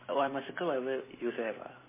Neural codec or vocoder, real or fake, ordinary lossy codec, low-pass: none; real; MP3, 16 kbps; 3.6 kHz